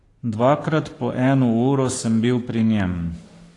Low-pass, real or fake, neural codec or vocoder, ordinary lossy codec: 10.8 kHz; fake; codec, 44.1 kHz, 7.8 kbps, Pupu-Codec; AAC, 48 kbps